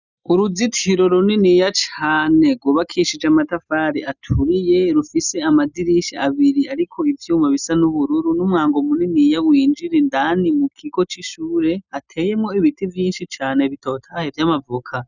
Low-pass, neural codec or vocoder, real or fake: 7.2 kHz; none; real